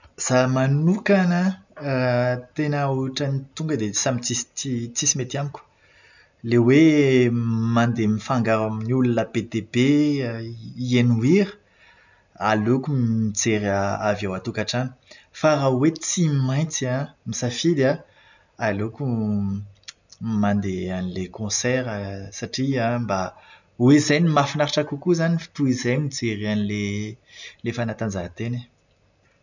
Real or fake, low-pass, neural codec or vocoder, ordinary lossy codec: real; 7.2 kHz; none; none